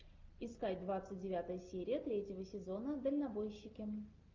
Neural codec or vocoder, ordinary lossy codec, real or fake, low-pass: none; Opus, 24 kbps; real; 7.2 kHz